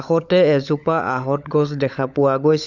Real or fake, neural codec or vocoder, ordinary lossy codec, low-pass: fake; codec, 16 kHz, 16 kbps, FunCodec, trained on LibriTTS, 50 frames a second; none; 7.2 kHz